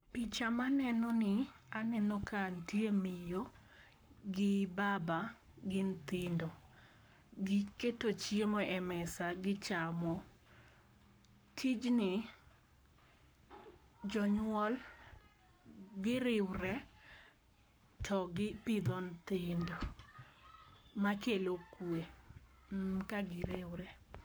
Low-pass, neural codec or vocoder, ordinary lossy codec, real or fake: none; codec, 44.1 kHz, 7.8 kbps, Pupu-Codec; none; fake